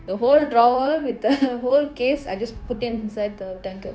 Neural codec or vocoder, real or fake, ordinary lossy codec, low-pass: codec, 16 kHz, 0.9 kbps, LongCat-Audio-Codec; fake; none; none